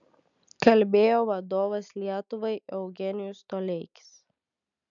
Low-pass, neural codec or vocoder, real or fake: 7.2 kHz; none; real